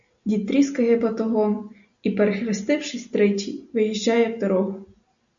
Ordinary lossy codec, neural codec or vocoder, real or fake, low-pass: AAC, 64 kbps; none; real; 7.2 kHz